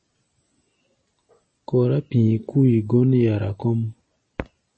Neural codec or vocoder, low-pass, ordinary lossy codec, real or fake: none; 9.9 kHz; MP3, 32 kbps; real